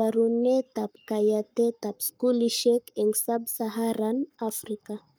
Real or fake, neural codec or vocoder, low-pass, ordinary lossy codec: fake; codec, 44.1 kHz, 7.8 kbps, Pupu-Codec; none; none